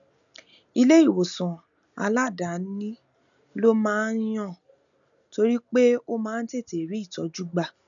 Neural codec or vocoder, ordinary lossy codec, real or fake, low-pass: none; none; real; 7.2 kHz